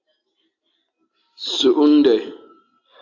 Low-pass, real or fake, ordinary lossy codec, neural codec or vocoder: 7.2 kHz; real; AAC, 48 kbps; none